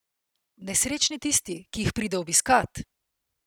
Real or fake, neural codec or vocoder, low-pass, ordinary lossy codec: real; none; none; none